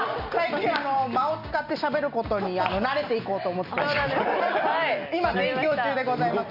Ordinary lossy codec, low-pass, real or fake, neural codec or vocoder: none; 5.4 kHz; real; none